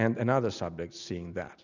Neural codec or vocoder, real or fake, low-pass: none; real; 7.2 kHz